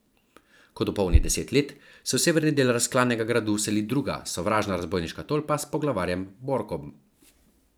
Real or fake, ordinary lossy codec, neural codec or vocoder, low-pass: fake; none; vocoder, 44.1 kHz, 128 mel bands every 512 samples, BigVGAN v2; none